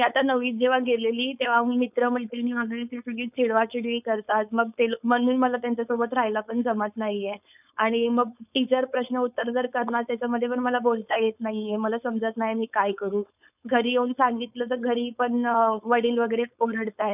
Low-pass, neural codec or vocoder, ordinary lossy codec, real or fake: 3.6 kHz; codec, 16 kHz, 4.8 kbps, FACodec; none; fake